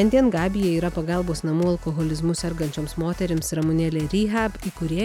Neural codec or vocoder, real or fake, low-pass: none; real; 19.8 kHz